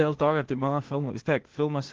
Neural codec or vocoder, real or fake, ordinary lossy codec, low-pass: codec, 16 kHz, 0.8 kbps, ZipCodec; fake; Opus, 16 kbps; 7.2 kHz